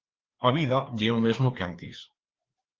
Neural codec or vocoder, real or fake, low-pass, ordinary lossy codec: codec, 16 kHz, 2 kbps, FreqCodec, larger model; fake; 7.2 kHz; Opus, 16 kbps